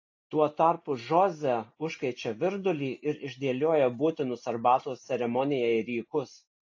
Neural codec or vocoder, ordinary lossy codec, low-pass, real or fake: none; AAC, 48 kbps; 7.2 kHz; real